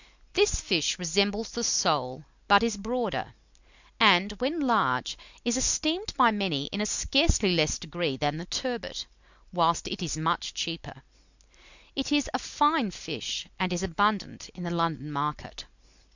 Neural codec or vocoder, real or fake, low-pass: none; real; 7.2 kHz